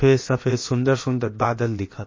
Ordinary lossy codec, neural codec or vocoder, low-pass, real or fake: MP3, 32 kbps; codec, 16 kHz, about 1 kbps, DyCAST, with the encoder's durations; 7.2 kHz; fake